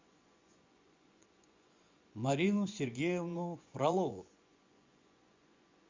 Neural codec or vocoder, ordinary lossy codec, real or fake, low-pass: vocoder, 24 kHz, 100 mel bands, Vocos; MP3, 64 kbps; fake; 7.2 kHz